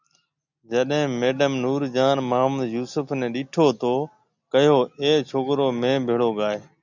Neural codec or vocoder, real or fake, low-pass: none; real; 7.2 kHz